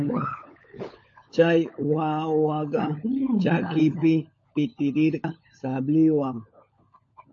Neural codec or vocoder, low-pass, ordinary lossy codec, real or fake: codec, 16 kHz, 16 kbps, FunCodec, trained on LibriTTS, 50 frames a second; 7.2 kHz; MP3, 32 kbps; fake